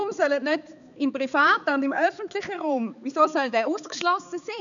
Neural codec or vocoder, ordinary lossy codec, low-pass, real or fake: codec, 16 kHz, 4 kbps, X-Codec, HuBERT features, trained on balanced general audio; none; 7.2 kHz; fake